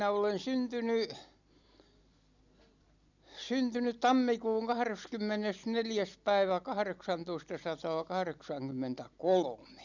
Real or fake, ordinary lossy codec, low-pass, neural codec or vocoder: real; none; 7.2 kHz; none